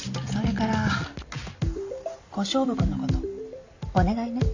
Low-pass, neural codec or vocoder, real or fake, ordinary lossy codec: 7.2 kHz; none; real; AAC, 48 kbps